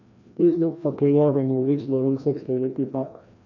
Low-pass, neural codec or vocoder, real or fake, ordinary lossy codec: 7.2 kHz; codec, 16 kHz, 1 kbps, FreqCodec, larger model; fake; none